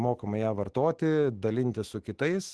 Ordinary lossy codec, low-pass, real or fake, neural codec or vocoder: Opus, 16 kbps; 10.8 kHz; fake; autoencoder, 48 kHz, 128 numbers a frame, DAC-VAE, trained on Japanese speech